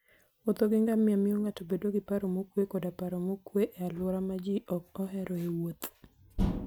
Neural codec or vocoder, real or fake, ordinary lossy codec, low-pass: none; real; none; none